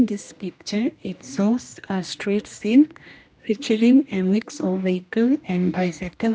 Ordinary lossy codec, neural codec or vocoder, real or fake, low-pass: none; codec, 16 kHz, 1 kbps, X-Codec, HuBERT features, trained on general audio; fake; none